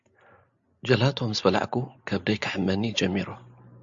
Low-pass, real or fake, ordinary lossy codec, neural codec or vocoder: 7.2 kHz; real; AAC, 64 kbps; none